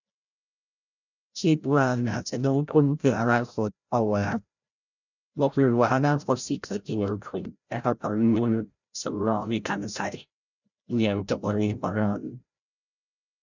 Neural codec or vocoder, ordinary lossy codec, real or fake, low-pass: codec, 16 kHz, 0.5 kbps, FreqCodec, larger model; none; fake; 7.2 kHz